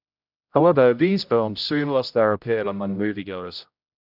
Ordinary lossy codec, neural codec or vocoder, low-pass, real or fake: none; codec, 16 kHz, 0.5 kbps, X-Codec, HuBERT features, trained on general audio; 5.4 kHz; fake